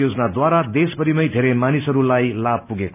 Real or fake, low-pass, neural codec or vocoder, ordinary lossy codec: real; 3.6 kHz; none; none